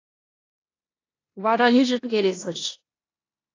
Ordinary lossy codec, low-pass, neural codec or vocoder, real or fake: AAC, 32 kbps; 7.2 kHz; codec, 16 kHz in and 24 kHz out, 0.9 kbps, LongCat-Audio-Codec, four codebook decoder; fake